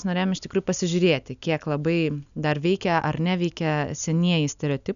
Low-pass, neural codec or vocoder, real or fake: 7.2 kHz; none; real